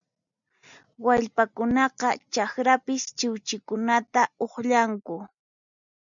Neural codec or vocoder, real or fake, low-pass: none; real; 7.2 kHz